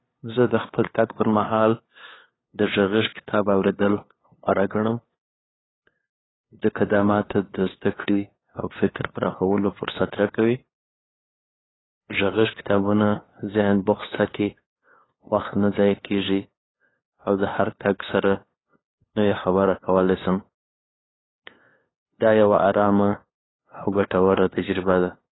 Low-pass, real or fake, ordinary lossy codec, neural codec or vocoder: 7.2 kHz; fake; AAC, 16 kbps; codec, 16 kHz, 2 kbps, FunCodec, trained on LibriTTS, 25 frames a second